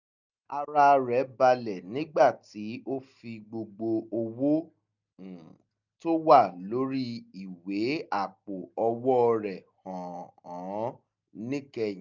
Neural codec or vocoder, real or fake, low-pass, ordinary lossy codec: none; real; 7.2 kHz; none